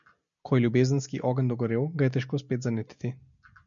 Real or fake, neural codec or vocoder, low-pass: real; none; 7.2 kHz